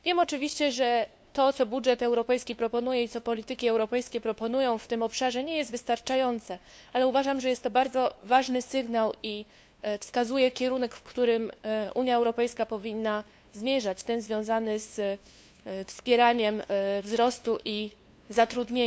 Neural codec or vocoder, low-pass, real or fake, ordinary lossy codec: codec, 16 kHz, 2 kbps, FunCodec, trained on LibriTTS, 25 frames a second; none; fake; none